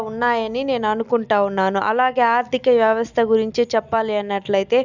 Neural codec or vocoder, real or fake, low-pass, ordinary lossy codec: none; real; 7.2 kHz; none